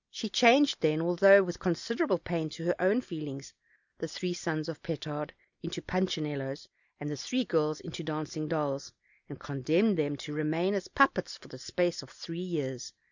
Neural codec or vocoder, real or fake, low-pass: none; real; 7.2 kHz